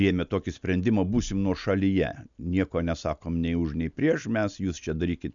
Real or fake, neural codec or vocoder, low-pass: real; none; 7.2 kHz